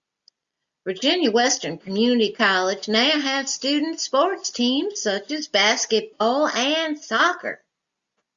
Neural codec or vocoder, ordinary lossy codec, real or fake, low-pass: none; Opus, 64 kbps; real; 7.2 kHz